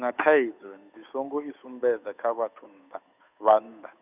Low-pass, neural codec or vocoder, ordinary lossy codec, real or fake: 3.6 kHz; codec, 16 kHz, 8 kbps, FunCodec, trained on Chinese and English, 25 frames a second; none; fake